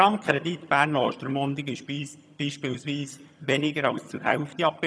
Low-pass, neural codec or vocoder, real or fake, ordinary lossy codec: none; vocoder, 22.05 kHz, 80 mel bands, HiFi-GAN; fake; none